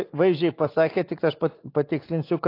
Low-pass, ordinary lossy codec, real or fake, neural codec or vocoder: 5.4 kHz; AAC, 32 kbps; real; none